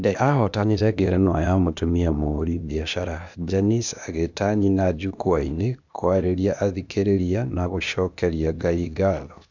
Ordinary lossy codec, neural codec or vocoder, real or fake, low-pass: none; codec, 16 kHz, 0.8 kbps, ZipCodec; fake; 7.2 kHz